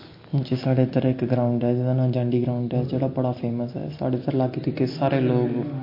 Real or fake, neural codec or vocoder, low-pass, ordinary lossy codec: real; none; 5.4 kHz; MP3, 32 kbps